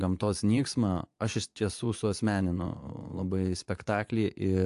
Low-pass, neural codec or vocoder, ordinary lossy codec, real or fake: 10.8 kHz; vocoder, 24 kHz, 100 mel bands, Vocos; AAC, 64 kbps; fake